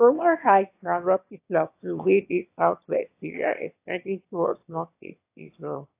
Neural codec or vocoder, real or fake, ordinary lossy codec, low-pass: autoencoder, 22.05 kHz, a latent of 192 numbers a frame, VITS, trained on one speaker; fake; AAC, 32 kbps; 3.6 kHz